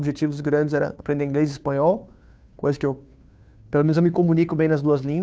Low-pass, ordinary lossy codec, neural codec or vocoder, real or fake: none; none; codec, 16 kHz, 2 kbps, FunCodec, trained on Chinese and English, 25 frames a second; fake